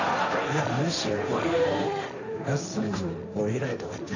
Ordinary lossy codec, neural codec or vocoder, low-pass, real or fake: none; codec, 16 kHz, 1.1 kbps, Voila-Tokenizer; 7.2 kHz; fake